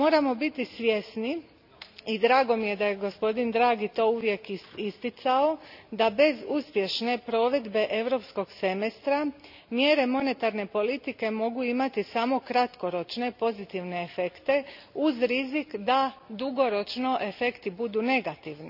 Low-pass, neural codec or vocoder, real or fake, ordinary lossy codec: 5.4 kHz; none; real; none